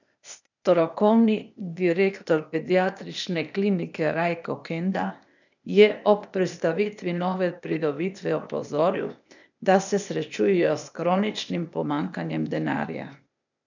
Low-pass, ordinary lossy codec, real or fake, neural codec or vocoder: 7.2 kHz; none; fake; codec, 16 kHz, 0.8 kbps, ZipCodec